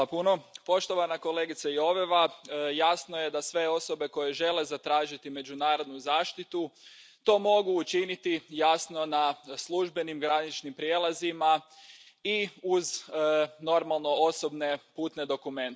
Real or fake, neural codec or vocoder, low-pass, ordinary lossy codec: real; none; none; none